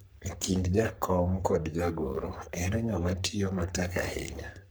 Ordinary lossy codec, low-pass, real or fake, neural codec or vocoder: none; none; fake; codec, 44.1 kHz, 3.4 kbps, Pupu-Codec